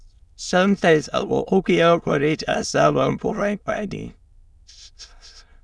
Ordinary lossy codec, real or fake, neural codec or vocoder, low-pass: none; fake; autoencoder, 22.05 kHz, a latent of 192 numbers a frame, VITS, trained on many speakers; none